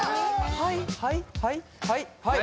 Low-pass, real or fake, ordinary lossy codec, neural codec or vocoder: none; real; none; none